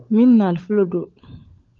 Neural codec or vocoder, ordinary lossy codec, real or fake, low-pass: codec, 16 kHz, 16 kbps, FunCodec, trained on LibriTTS, 50 frames a second; Opus, 24 kbps; fake; 7.2 kHz